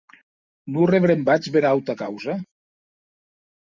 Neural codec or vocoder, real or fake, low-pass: none; real; 7.2 kHz